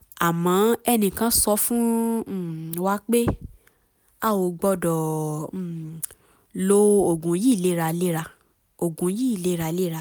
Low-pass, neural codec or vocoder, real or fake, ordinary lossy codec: none; none; real; none